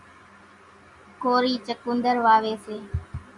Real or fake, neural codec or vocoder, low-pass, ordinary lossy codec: real; none; 10.8 kHz; MP3, 96 kbps